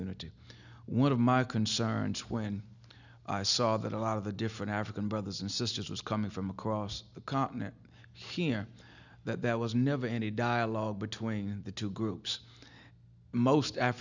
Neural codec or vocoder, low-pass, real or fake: none; 7.2 kHz; real